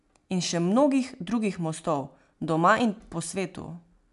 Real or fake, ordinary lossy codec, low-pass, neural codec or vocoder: real; none; 10.8 kHz; none